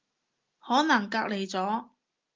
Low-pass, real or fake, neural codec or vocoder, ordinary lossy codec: 7.2 kHz; real; none; Opus, 32 kbps